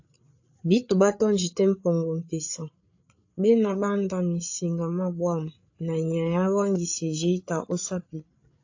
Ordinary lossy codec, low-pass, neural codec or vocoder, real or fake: AAC, 48 kbps; 7.2 kHz; codec, 16 kHz, 8 kbps, FreqCodec, larger model; fake